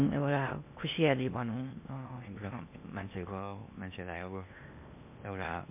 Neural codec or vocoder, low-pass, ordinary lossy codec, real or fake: codec, 16 kHz in and 24 kHz out, 0.8 kbps, FocalCodec, streaming, 65536 codes; 3.6 kHz; MP3, 32 kbps; fake